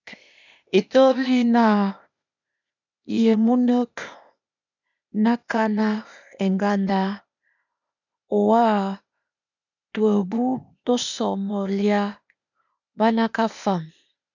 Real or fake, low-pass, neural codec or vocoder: fake; 7.2 kHz; codec, 16 kHz, 0.8 kbps, ZipCodec